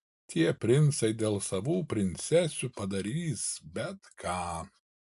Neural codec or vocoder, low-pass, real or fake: none; 10.8 kHz; real